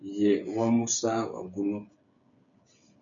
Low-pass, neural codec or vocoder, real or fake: 7.2 kHz; codec, 16 kHz, 8 kbps, FreqCodec, smaller model; fake